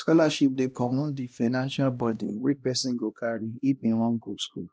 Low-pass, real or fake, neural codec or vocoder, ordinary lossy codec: none; fake; codec, 16 kHz, 1 kbps, X-Codec, HuBERT features, trained on LibriSpeech; none